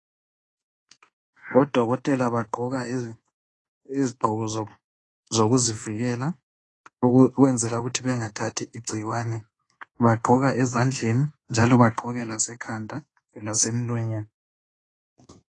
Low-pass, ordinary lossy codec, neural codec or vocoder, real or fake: 10.8 kHz; AAC, 32 kbps; codec, 24 kHz, 1.2 kbps, DualCodec; fake